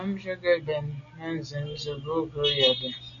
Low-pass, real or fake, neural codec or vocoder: 7.2 kHz; real; none